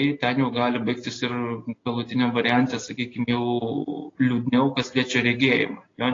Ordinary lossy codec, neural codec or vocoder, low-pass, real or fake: AAC, 32 kbps; none; 7.2 kHz; real